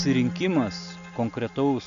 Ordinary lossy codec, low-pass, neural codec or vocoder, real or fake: MP3, 96 kbps; 7.2 kHz; none; real